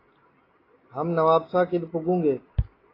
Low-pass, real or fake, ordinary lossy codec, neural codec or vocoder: 5.4 kHz; real; AAC, 24 kbps; none